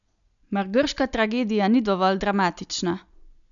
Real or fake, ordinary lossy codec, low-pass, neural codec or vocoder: real; none; 7.2 kHz; none